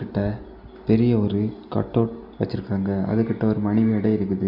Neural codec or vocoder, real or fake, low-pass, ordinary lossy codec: none; real; 5.4 kHz; none